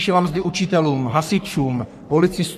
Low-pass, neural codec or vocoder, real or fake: 14.4 kHz; codec, 44.1 kHz, 3.4 kbps, Pupu-Codec; fake